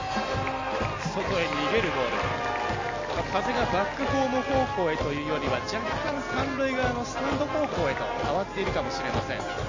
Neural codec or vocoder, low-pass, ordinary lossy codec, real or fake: none; 7.2 kHz; MP3, 32 kbps; real